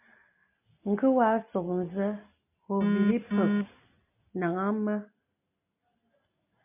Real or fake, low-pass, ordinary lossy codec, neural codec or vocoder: real; 3.6 kHz; MP3, 32 kbps; none